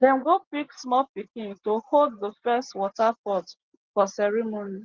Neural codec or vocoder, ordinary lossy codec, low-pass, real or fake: none; Opus, 16 kbps; 7.2 kHz; real